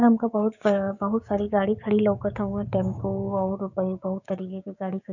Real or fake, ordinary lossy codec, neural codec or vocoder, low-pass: fake; none; codec, 16 kHz, 6 kbps, DAC; 7.2 kHz